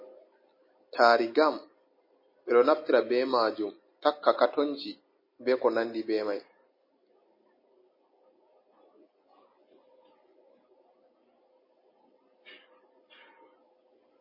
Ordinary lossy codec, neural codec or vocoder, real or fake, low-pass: MP3, 24 kbps; none; real; 5.4 kHz